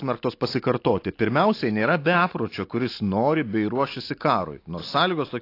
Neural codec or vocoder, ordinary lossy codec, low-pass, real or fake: none; AAC, 32 kbps; 5.4 kHz; real